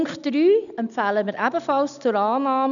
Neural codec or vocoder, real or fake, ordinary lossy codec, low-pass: none; real; none; 7.2 kHz